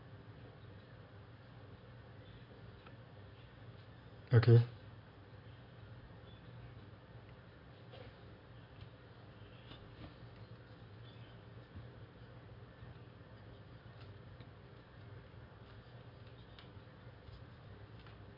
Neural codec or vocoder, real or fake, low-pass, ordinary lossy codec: none; real; 5.4 kHz; none